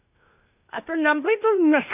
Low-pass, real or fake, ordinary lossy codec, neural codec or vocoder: 3.6 kHz; fake; none; codec, 16 kHz, 0.8 kbps, ZipCodec